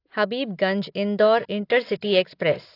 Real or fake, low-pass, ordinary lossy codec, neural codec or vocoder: real; 5.4 kHz; AAC, 32 kbps; none